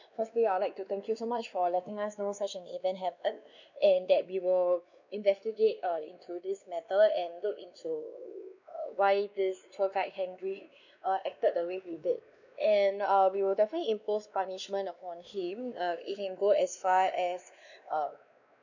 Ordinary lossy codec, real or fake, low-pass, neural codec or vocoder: none; fake; 7.2 kHz; codec, 16 kHz, 2 kbps, X-Codec, WavLM features, trained on Multilingual LibriSpeech